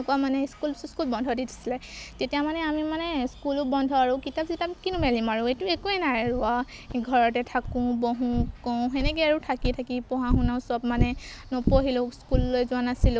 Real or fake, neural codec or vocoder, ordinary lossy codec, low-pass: real; none; none; none